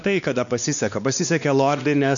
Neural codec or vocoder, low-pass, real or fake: codec, 16 kHz, 2 kbps, X-Codec, WavLM features, trained on Multilingual LibriSpeech; 7.2 kHz; fake